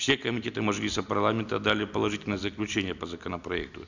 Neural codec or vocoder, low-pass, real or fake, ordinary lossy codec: none; 7.2 kHz; real; none